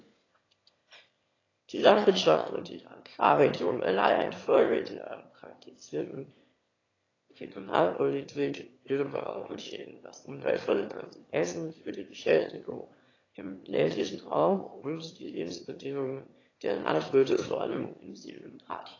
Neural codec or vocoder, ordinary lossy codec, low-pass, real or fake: autoencoder, 22.05 kHz, a latent of 192 numbers a frame, VITS, trained on one speaker; AAC, 32 kbps; 7.2 kHz; fake